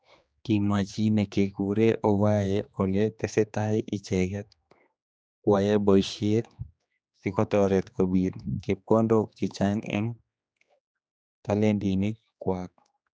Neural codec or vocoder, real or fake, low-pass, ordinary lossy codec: codec, 16 kHz, 2 kbps, X-Codec, HuBERT features, trained on general audio; fake; none; none